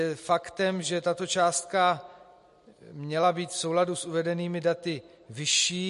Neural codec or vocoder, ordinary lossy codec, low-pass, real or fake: none; MP3, 48 kbps; 14.4 kHz; real